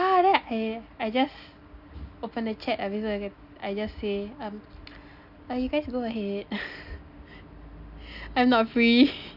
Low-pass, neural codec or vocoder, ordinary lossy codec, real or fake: 5.4 kHz; none; none; real